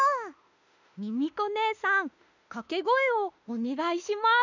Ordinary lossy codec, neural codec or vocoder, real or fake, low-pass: none; autoencoder, 48 kHz, 32 numbers a frame, DAC-VAE, trained on Japanese speech; fake; 7.2 kHz